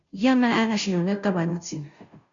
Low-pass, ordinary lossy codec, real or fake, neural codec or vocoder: 7.2 kHz; MP3, 64 kbps; fake; codec, 16 kHz, 0.5 kbps, FunCodec, trained on Chinese and English, 25 frames a second